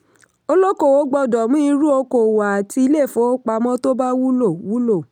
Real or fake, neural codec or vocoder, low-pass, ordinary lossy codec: real; none; 19.8 kHz; none